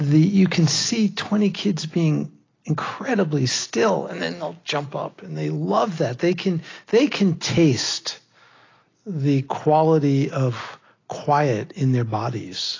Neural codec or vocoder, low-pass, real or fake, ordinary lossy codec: none; 7.2 kHz; real; AAC, 32 kbps